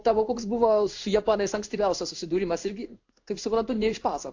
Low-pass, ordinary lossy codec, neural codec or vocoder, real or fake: 7.2 kHz; AAC, 48 kbps; codec, 16 kHz in and 24 kHz out, 1 kbps, XY-Tokenizer; fake